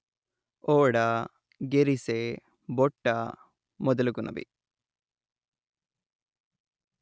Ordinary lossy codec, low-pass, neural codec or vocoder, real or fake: none; none; none; real